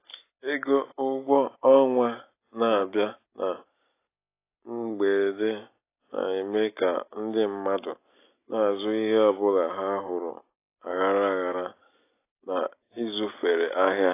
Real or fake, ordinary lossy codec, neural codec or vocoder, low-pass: real; AAC, 24 kbps; none; 3.6 kHz